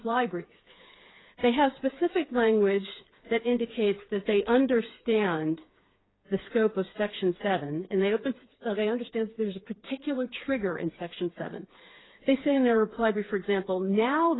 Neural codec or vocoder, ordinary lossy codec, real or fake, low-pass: codec, 16 kHz, 4 kbps, FreqCodec, smaller model; AAC, 16 kbps; fake; 7.2 kHz